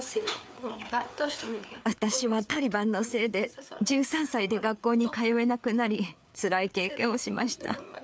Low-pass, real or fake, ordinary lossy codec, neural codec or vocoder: none; fake; none; codec, 16 kHz, 4 kbps, FreqCodec, larger model